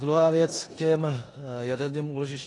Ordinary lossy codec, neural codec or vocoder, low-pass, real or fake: AAC, 32 kbps; codec, 16 kHz in and 24 kHz out, 0.9 kbps, LongCat-Audio-Codec, four codebook decoder; 10.8 kHz; fake